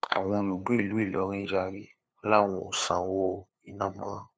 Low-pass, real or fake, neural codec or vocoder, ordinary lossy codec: none; fake; codec, 16 kHz, 2 kbps, FunCodec, trained on LibriTTS, 25 frames a second; none